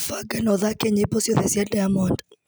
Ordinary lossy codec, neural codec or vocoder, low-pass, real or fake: none; none; none; real